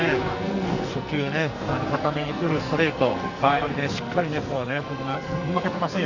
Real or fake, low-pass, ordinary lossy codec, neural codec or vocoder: fake; 7.2 kHz; Opus, 64 kbps; codec, 44.1 kHz, 2.6 kbps, SNAC